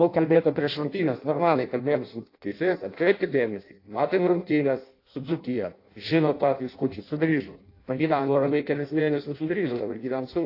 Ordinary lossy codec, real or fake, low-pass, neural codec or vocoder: AAC, 32 kbps; fake; 5.4 kHz; codec, 16 kHz in and 24 kHz out, 0.6 kbps, FireRedTTS-2 codec